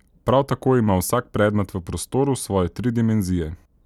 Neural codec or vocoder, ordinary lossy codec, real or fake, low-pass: none; none; real; 19.8 kHz